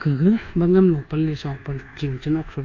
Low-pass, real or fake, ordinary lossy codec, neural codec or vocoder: 7.2 kHz; fake; AAC, 48 kbps; codec, 24 kHz, 1.2 kbps, DualCodec